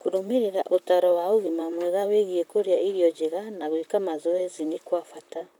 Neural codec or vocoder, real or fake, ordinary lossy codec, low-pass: vocoder, 44.1 kHz, 128 mel bands, Pupu-Vocoder; fake; none; none